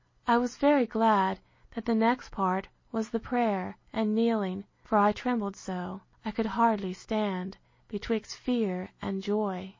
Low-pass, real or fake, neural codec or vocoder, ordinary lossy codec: 7.2 kHz; real; none; MP3, 32 kbps